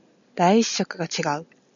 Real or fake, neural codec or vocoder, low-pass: real; none; 7.2 kHz